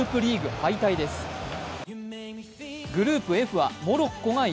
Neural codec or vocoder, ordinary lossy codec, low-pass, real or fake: none; none; none; real